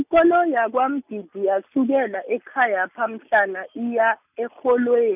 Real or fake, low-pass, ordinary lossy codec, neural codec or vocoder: real; 3.6 kHz; none; none